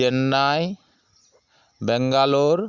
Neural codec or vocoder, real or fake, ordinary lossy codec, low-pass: none; real; Opus, 64 kbps; 7.2 kHz